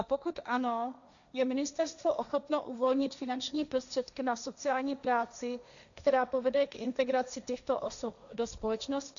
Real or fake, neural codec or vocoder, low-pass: fake; codec, 16 kHz, 1.1 kbps, Voila-Tokenizer; 7.2 kHz